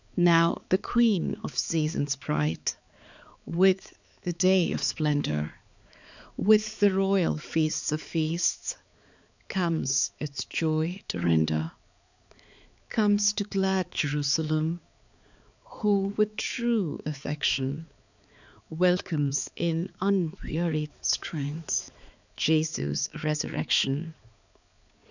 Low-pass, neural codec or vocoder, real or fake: 7.2 kHz; codec, 16 kHz, 4 kbps, X-Codec, HuBERT features, trained on balanced general audio; fake